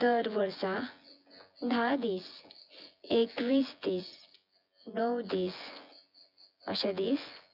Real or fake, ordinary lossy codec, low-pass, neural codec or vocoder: fake; none; 5.4 kHz; vocoder, 24 kHz, 100 mel bands, Vocos